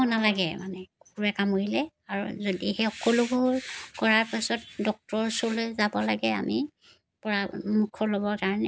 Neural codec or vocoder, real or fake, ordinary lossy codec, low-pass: none; real; none; none